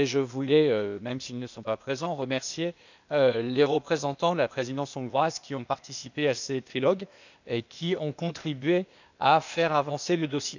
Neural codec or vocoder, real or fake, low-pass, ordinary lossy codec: codec, 16 kHz, 0.8 kbps, ZipCodec; fake; 7.2 kHz; none